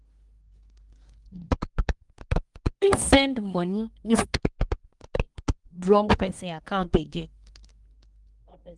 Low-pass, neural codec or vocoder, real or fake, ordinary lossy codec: 10.8 kHz; codec, 24 kHz, 1 kbps, SNAC; fake; Opus, 24 kbps